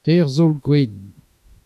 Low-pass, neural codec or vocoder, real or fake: 14.4 kHz; autoencoder, 48 kHz, 32 numbers a frame, DAC-VAE, trained on Japanese speech; fake